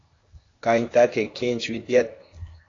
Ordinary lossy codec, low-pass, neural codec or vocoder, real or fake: AAC, 32 kbps; 7.2 kHz; codec, 16 kHz, 0.8 kbps, ZipCodec; fake